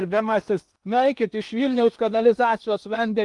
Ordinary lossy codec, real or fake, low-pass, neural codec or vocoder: Opus, 32 kbps; fake; 10.8 kHz; codec, 16 kHz in and 24 kHz out, 0.8 kbps, FocalCodec, streaming, 65536 codes